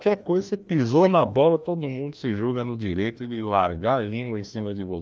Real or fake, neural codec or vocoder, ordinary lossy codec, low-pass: fake; codec, 16 kHz, 1 kbps, FreqCodec, larger model; none; none